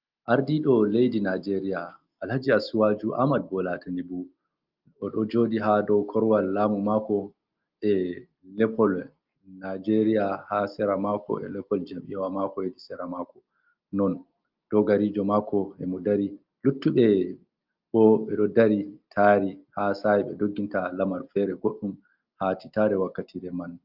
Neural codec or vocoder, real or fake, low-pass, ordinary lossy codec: none; real; 5.4 kHz; Opus, 24 kbps